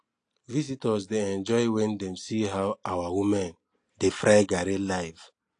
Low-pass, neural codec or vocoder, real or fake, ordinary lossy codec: 9.9 kHz; none; real; AAC, 48 kbps